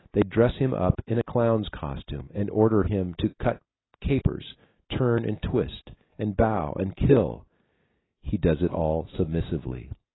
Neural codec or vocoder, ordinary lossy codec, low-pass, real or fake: none; AAC, 16 kbps; 7.2 kHz; real